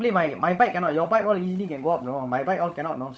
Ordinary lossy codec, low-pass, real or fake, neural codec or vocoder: none; none; fake; codec, 16 kHz, 16 kbps, FunCodec, trained on LibriTTS, 50 frames a second